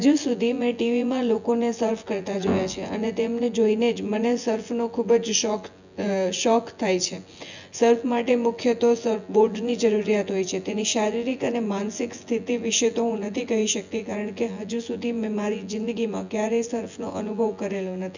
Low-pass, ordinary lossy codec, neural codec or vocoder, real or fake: 7.2 kHz; none; vocoder, 24 kHz, 100 mel bands, Vocos; fake